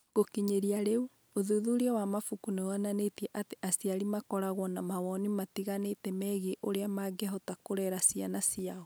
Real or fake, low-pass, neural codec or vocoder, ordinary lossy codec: real; none; none; none